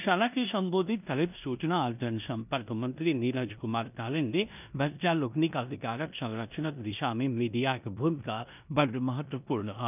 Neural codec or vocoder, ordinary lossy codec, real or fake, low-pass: codec, 16 kHz in and 24 kHz out, 0.9 kbps, LongCat-Audio-Codec, four codebook decoder; none; fake; 3.6 kHz